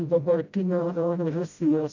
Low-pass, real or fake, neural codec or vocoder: 7.2 kHz; fake; codec, 16 kHz, 0.5 kbps, FreqCodec, smaller model